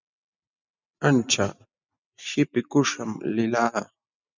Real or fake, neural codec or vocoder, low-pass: real; none; 7.2 kHz